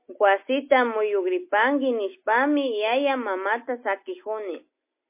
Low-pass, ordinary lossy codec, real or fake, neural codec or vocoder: 3.6 kHz; MP3, 24 kbps; real; none